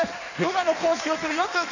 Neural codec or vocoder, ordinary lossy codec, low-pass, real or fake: codec, 16 kHz in and 24 kHz out, 1.1 kbps, FireRedTTS-2 codec; none; 7.2 kHz; fake